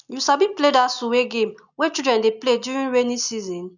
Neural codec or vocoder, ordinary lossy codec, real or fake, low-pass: none; none; real; 7.2 kHz